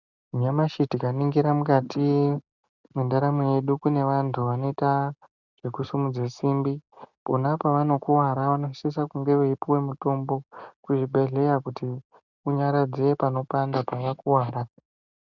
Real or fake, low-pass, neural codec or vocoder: real; 7.2 kHz; none